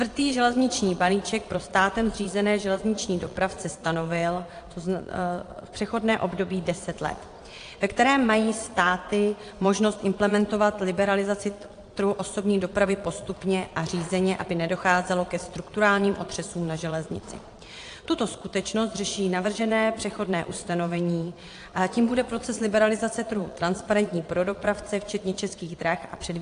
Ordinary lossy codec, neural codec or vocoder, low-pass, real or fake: AAC, 48 kbps; vocoder, 22.05 kHz, 80 mel bands, Vocos; 9.9 kHz; fake